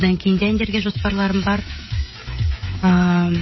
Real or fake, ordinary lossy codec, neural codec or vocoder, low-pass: fake; MP3, 24 kbps; codec, 16 kHz, 16 kbps, FreqCodec, smaller model; 7.2 kHz